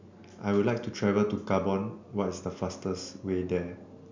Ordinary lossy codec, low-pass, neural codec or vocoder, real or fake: MP3, 64 kbps; 7.2 kHz; none; real